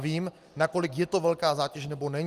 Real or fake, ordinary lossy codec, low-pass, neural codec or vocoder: real; Opus, 24 kbps; 14.4 kHz; none